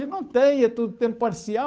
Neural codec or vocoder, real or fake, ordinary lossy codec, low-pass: codec, 16 kHz, 8 kbps, FunCodec, trained on Chinese and English, 25 frames a second; fake; none; none